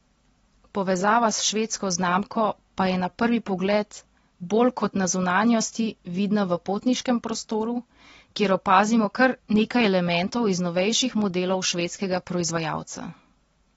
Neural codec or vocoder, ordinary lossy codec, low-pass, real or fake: none; AAC, 24 kbps; 19.8 kHz; real